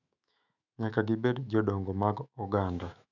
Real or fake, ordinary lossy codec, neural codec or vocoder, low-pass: fake; none; autoencoder, 48 kHz, 128 numbers a frame, DAC-VAE, trained on Japanese speech; 7.2 kHz